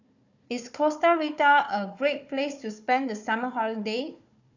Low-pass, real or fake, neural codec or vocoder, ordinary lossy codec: 7.2 kHz; fake; codec, 16 kHz, 4 kbps, FunCodec, trained on Chinese and English, 50 frames a second; none